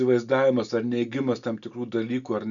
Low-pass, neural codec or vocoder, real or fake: 7.2 kHz; none; real